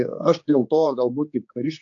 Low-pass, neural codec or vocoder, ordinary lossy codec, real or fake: 7.2 kHz; codec, 16 kHz, 4 kbps, X-Codec, HuBERT features, trained on general audio; AAC, 48 kbps; fake